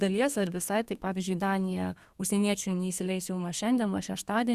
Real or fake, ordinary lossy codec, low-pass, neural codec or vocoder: fake; Opus, 64 kbps; 14.4 kHz; codec, 32 kHz, 1.9 kbps, SNAC